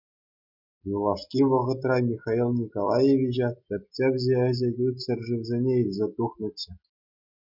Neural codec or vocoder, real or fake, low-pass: none; real; 5.4 kHz